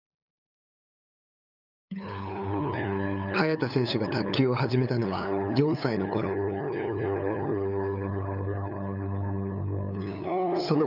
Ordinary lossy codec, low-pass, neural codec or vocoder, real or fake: none; 5.4 kHz; codec, 16 kHz, 8 kbps, FunCodec, trained on LibriTTS, 25 frames a second; fake